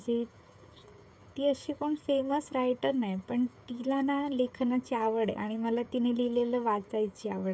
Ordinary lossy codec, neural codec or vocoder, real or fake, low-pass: none; codec, 16 kHz, 16 kbps, FreqCodec, smaller model; fake; none